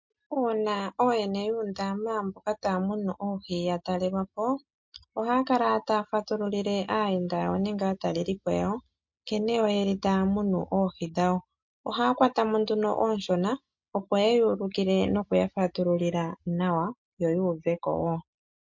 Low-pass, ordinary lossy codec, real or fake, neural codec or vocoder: 7.2 kHz; MP3, 48 kbps; real; none